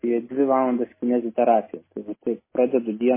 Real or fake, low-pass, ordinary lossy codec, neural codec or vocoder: real; 3.6 kHz; MP3, 16 kbps; none